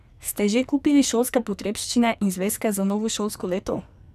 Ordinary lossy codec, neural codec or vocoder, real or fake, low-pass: none; codec, 44.1 kHz, 2.6 kbps, SNAC; fake; 14.4 kHz